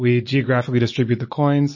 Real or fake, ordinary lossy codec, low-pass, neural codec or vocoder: real; MP3, 32 kbps; 7.2 kHz; none